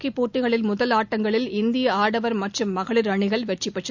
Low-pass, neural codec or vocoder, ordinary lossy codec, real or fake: 7.2 kHz; none; none; real